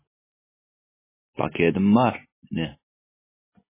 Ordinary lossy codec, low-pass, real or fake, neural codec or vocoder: MP3, 16 kbps; 3.6 kHz; real; none